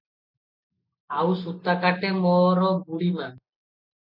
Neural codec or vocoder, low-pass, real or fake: none; 5.4 kHz; real